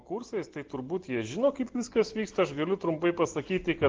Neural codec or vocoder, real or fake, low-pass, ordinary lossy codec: none; real; 7.2 kHz; Opus, 16 kbps